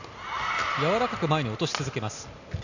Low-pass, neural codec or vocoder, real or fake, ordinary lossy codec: 7.2 kHz; none; real; none